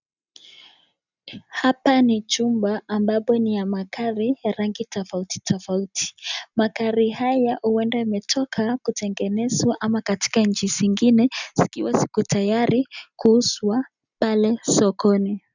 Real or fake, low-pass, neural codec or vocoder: real; 7.2 kHz; none